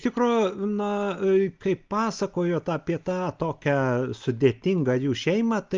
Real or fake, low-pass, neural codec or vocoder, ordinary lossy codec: real; 7.2 kHz; none; Opus, 24 kbps